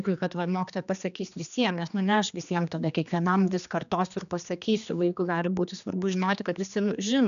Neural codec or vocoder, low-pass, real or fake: codec, 16 kHz, 2 kbps, X-Codec, HuBERT features, trained on general audio; 7.2 kHz; fake